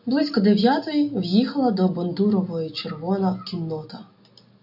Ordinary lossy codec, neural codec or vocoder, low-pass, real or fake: AAC, 48 kbps; none; 5.4 kHz; real